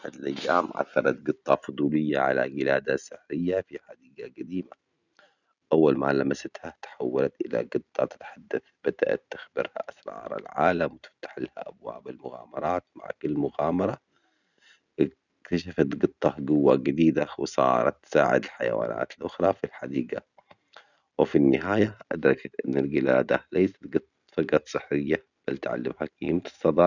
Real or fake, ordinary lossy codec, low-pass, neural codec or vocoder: real; none; 7.2 kHz; none